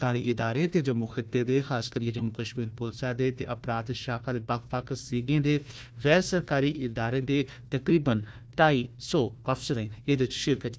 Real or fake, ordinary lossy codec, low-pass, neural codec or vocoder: fake; none; none; codec, 16 kHz, 1 kbps, FunCodec, trained on Chinese and English, 50 frames a second